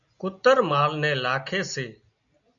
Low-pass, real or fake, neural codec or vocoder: 7.2 kHz; real; none